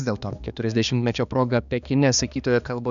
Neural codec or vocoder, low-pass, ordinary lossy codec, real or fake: codec, 16 kHz, 2 kbps, X-Codec, HuBERT features, trained on balanced general audio; 7.2 kHz; MP3, 96 kbps; fake